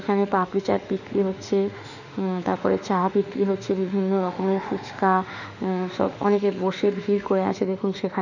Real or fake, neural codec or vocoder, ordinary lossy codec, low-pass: fake; autoencoder, 48 kHz, 32 numbers a frame, DAC-VAE, trained on Japanese speech; none; 7.2 kHz